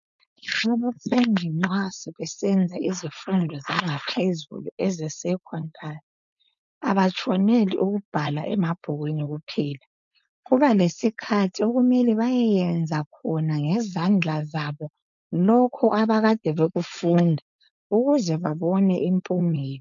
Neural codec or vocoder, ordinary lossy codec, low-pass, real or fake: codec, 16 kHz, 4.8 kbps, FACodec; MP3, 96 kbps; 7.2 kHz; fake